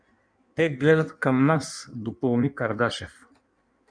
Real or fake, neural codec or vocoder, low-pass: fake; codec, 16 kHz in and 24 kHz out, 1.1 kbps, FireRedTTS-2 codec; 9.9 kHz